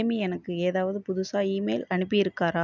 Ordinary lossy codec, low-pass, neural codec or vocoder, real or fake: none; 7.2 kHz; none; real